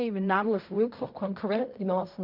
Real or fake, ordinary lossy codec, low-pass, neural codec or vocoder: fake; MP3, 48 kbps; 5.4 kHz; codec, 16 kHz in and 24 kHz out, 0.4 kbps, LongCat-Audio-Codec, fine tuned four codebook decoder